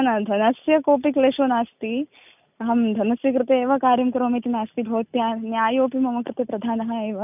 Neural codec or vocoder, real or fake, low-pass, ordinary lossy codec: none; real; 3.6 kHz; none